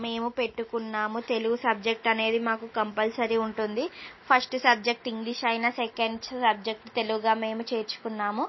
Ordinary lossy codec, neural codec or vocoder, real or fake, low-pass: MP3, 24 kbps; none; real; 7.2 kHz